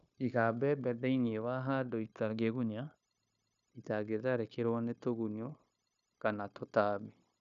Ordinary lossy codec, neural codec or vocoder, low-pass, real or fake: none; codec, 16 kHz, 0.9 kbps, LongCat-Audio-Codec; 7.2 kHz; fake